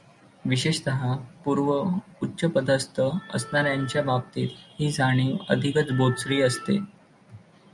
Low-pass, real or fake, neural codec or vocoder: 10.8 kHz; real; none